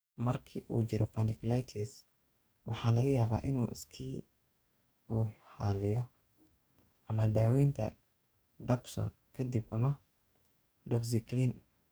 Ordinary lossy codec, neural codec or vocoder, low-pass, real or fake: none; codec, 44.1 kHz, 2.6 kbps, DAC; none; fake